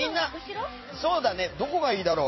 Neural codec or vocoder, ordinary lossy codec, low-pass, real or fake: vocoder, 44.1 kHz, 128 mel bands every 256 samples, BigVGAN v2; MP3, 24 kbps; 7.2 kHz; fake